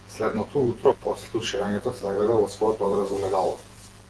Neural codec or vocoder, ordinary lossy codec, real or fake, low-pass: vocoder, 48 kHz, 128 mel bands, Vocos; Opus, 16 kbps; fake; 10.8 kHz